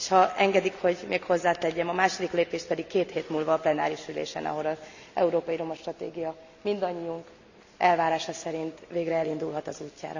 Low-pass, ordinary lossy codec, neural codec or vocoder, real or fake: 7.2 kHz; none; none; real